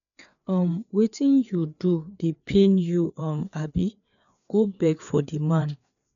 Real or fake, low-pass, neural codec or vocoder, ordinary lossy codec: fake; 7.2 kHz; codec, 16 kHz, 4 kbps, FreqCodec, larger model; none